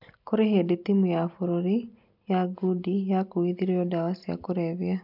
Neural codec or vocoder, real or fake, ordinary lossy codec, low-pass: none; real; none; 5.4 kHz